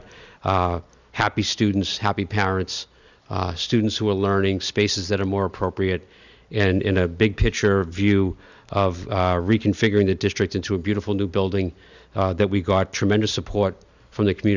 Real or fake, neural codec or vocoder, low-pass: real; none; 7.2 kHz